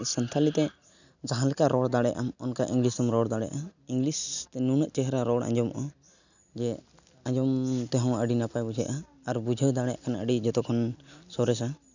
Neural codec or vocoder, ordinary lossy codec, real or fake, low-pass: none; none; real; 7.2 kHz